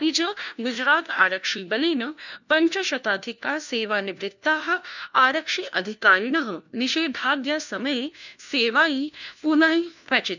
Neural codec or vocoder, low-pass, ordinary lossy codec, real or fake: codec, 16 kHz, 1 kbps, FunCodec, trained on LibriTTS, 50 frames a second; 7.2 kHz; none; fake